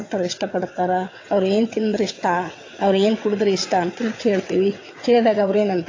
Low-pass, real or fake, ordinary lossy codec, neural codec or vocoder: 7.2 kHz; fake; AAC, 32 kbps; vocoder, 22.05 kHz, 80 mel bands, WaveNeXt